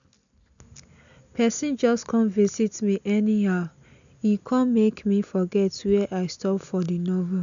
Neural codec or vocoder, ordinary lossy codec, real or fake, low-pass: none; none; real; 7.2 kHz